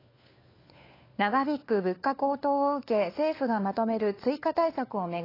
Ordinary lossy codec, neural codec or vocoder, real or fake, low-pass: AAC, 24 kbps; codec, 16 kHz, 4 kbps, FunCodec, trained on LibriTTS, 50 frames a second; fake; 5.4 kHz